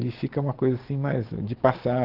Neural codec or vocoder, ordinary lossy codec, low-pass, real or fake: none; Opus, 16 kbps; 5.4 kHz; real